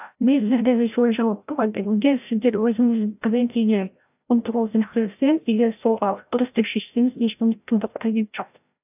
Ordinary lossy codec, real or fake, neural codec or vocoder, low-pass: AAC, 32 kbps; fake; codec, 16 kHz, 0.5 kbps, FreqCodec, larger model; 3.6 kHz